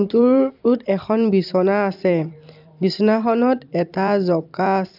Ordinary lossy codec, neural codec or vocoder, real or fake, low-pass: AAC, 48 kbps; vocoder, 44.1 kHz, 128 mel bands every 256 samples, BigVGAN v2; fake; 5.4 kHz